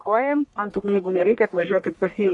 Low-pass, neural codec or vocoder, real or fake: 10.8 kHz; codec, 44.1 kHz, 1.7 kbps, Pupu-Codec; fake